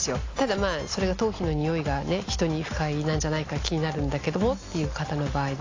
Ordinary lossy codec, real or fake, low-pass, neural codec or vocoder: AAC, 32 kbps; real; 7.2 kHz; none